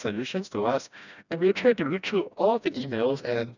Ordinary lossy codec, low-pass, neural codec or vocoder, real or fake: none; 7.2 kHz; codec, 16 kHz, 1 kbps, FreqCodec, smaller model; fake